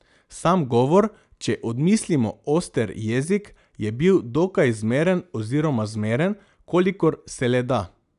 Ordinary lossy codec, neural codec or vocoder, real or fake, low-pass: none; none; real; 10.8 kHz